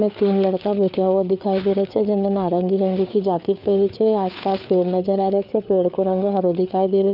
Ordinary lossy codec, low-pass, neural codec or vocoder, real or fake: none; 5.4 kHz; codec, 16 kHz, 4 kbps, FreqCodec, larger model; fake